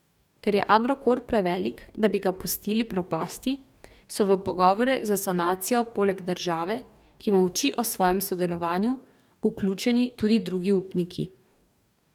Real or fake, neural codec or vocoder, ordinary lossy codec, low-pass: fake; codec, 44.1 kHz, 2.6 kbps, DAC; none; 19.8 kHz